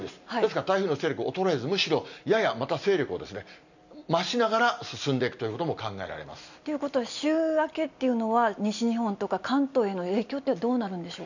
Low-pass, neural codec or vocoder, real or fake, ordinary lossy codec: 7.2 kHz; none; real; none